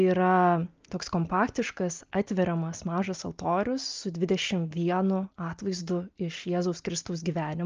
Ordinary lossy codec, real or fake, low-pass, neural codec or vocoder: Opus, 24 kbps; real; 7.2 kHz; none